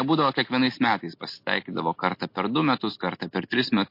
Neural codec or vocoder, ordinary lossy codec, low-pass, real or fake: none; MP3, 32 kbps; 5.4 kHz; real